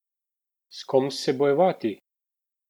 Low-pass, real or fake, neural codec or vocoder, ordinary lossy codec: 19.8 kHz; real; none; MP3, 96 kbps